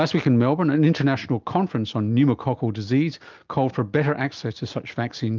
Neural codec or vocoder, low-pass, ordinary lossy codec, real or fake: none; 7.2 kHz; Opus, 32 kbps; real